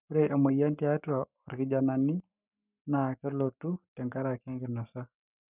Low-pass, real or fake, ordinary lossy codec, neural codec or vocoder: 3.6 kHz; fake; none; codec, 44.1 kHz, 7.8 kbps, Pupu-Codec